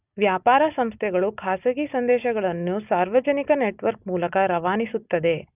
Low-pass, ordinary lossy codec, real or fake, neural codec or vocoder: 3.6 kHz; none; real; none